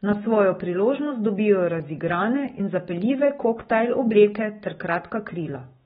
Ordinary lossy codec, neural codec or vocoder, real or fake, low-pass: AAC, 16 kbps; autoencoder, 48 kHz, 128 numbers a frame, DAC-VAE, trained on Japanese speech; fake; 19.8 kHz